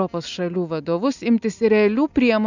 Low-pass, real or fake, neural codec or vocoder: 7.2 kHz; real; none